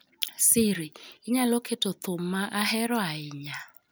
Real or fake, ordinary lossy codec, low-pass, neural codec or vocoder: real; none; none; none